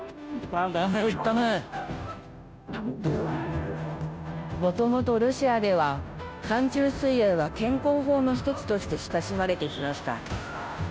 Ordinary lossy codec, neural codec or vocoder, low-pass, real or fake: none; codec, 16 kHz, 0.5 kbps, FunCodec, trained on Chinese and English, 25 frames a second; none; fake